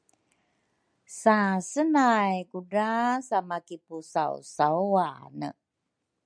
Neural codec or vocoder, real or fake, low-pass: none; real; 9.9 kHz